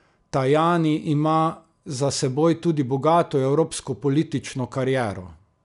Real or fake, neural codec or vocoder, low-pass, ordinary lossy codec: real; none; 10.8 kHz; none